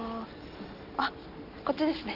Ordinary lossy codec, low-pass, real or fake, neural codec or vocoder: none; 5.4 kHz; real; none